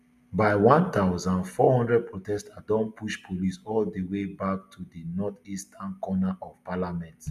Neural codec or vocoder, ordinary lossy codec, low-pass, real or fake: none; none; 14.4 kHz; real